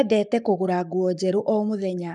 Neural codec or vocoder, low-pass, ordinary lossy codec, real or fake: codec, 44.1 kHz, 7.8 kbps, Pupu-Codec; 10.8 kHz; none; fake